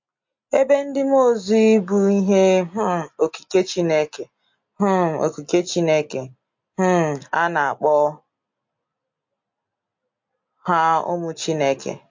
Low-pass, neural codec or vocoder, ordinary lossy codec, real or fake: 7.2 kHz; none; MP3, 48 kbps; real